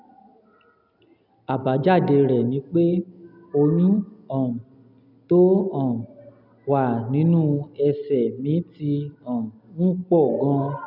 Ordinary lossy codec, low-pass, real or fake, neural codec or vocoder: none; 5.4 kHz; real; none